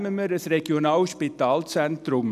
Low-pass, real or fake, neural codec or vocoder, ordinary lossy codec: 14.4 kHz; real; none; none